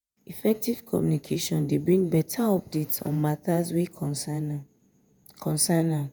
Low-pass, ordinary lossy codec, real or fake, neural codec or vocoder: none; none; fake; vocoder, 48 kHz, 128 mel bands, Vocos